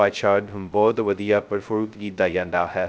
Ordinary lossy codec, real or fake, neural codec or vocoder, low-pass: none; fake; codec, 16 kHz, 0.2 kbps, FocalCodec; none